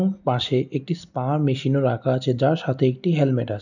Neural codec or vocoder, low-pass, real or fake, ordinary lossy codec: none; 7.2 kHz; real; none